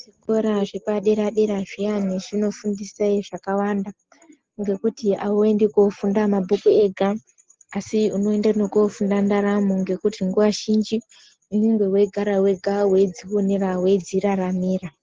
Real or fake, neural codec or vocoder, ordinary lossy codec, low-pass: real; none; Opus, 16 kbps; 7.2 kHz